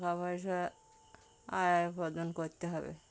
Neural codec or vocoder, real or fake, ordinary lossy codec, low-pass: none; real; none; none